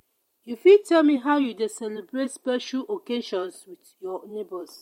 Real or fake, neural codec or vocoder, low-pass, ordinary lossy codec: fake; vocoder, 44.1 kHz, 128 mel bands every 512 samples, BigVGAN v2; 19.8 kHz; MP3, 64 kbps